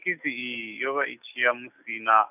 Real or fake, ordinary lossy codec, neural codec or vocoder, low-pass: real; none; none; 3.6 kHz